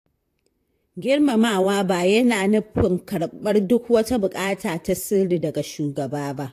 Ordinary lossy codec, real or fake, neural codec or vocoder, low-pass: AAC, 64 kbps; fake; vocoder, 44.1 kHz, 128 mel bands, Pupu-Vocoder; 14.4 kHz